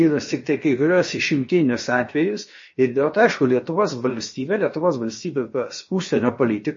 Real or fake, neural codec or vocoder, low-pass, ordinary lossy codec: fake; codec, 16 kHz, about 1 kbps, DyCAST, with the encoder's durations; 7.2 kHz; MP3, 32 kbps